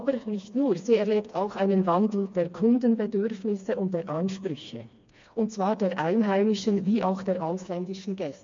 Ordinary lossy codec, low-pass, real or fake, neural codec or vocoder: MP3, 48 kbps; 7.2 kHz; fake; codec, 16 kHz, 2 kbps, FreqCodec, smaller model